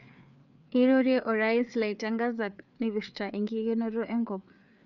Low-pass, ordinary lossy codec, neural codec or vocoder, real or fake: 7.2 kHz; none; codec, 16 kHz, 4 kbps, FreqCodec, larger model; fake